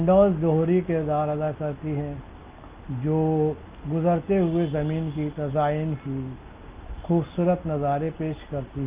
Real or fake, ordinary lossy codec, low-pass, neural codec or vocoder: real; Opus, 32 kbps; 3.6 kHz; none